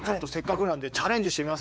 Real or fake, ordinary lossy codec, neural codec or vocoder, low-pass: fake; none; codec, 16 kHz, 4 kbps, X-Codec, WavLM features, trained on Multilingual LibriSpeech; none